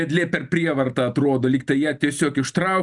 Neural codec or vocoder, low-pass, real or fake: none; 10.8 kHz; real